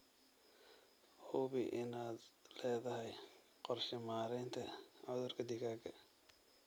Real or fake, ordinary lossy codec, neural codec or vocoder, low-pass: real; none; none; none